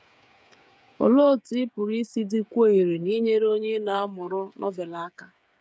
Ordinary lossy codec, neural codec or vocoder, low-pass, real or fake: none; codec, 16 kHz, 8 kbps, FreqCodec, smaller model; none; fake